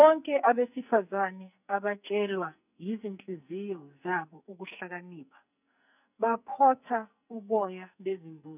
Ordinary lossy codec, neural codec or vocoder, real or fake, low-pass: none; codec, 44.1 kHz, 2.6 kbps, SNAC; fake; 3.6 kHz